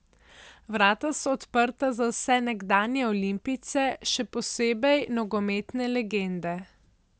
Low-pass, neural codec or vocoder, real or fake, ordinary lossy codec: none; none; real; none